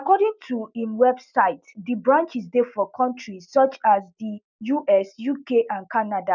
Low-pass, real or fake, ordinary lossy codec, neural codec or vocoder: 7.2 kHz; real; none; none